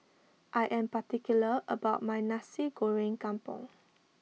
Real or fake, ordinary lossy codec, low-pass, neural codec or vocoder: real; none; none; none